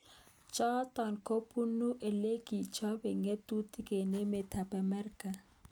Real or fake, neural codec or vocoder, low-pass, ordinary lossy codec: real; none; none; none